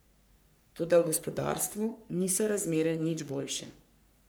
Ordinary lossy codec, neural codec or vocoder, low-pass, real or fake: none; codec, 44.1 kHz, 3.4 kbps, Pupu-Codec; none; fake